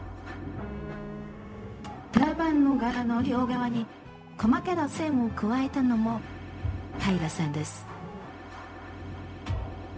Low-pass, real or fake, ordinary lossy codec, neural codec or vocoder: none; fake; none; codec, 16 kHz, 0.4 kbps, LongCat-Audio-Codec